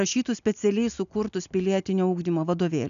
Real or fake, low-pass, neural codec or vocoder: real; 7.2 kHz; none